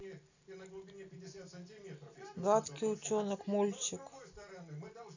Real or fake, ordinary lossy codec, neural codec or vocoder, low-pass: real; none; none; 7.2 kHz